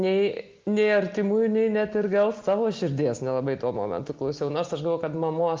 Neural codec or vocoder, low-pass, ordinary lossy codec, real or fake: none; 7.2 kHz; Opus, 24 kbps; real